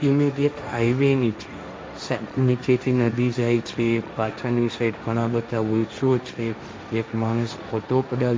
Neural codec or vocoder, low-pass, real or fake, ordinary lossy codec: codec, 16 kHz, 1.1 kbps, Voila-Tokenizer; none; fake; none